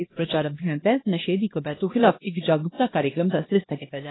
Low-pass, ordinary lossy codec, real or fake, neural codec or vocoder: 7.2 kHz; AAC, 16 kbps; fake; codec, 16 kHz, 1 kbps, X-Codec, HuBERT features, trained on LibriSpeech